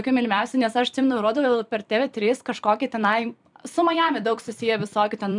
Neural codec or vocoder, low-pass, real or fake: vocoder, 44.1 kHz, 128 mel bands every 256 samples, BigVGAN v2; 10.8 kHz; fake